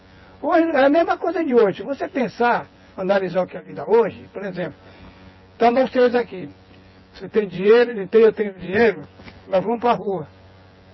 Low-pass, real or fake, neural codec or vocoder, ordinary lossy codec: 7.2 kHz; fake; vocoder, 24 kHz, 100 mel bands, Vocos; MP3, 24 kbps